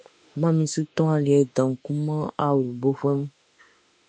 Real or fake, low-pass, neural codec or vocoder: fake; 9.9 kHz; autoencoder, 48 kHz, 32 numbers a frame, DAC-VAE, trained on Japanese speech